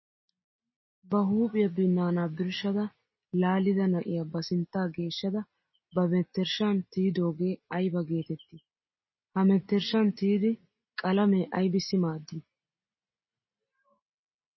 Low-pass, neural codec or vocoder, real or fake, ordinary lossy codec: 7.2 kHz; none; real; MP3, 24 kbps